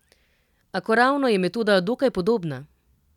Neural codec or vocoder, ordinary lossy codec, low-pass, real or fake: none; none; 19.8 kHz; real